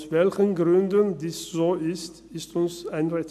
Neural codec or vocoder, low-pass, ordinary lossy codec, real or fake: none; 14.4 kHz; none; real